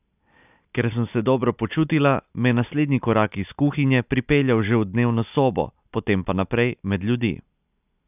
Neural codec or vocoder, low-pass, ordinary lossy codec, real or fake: none; 3.6 kHz; none; real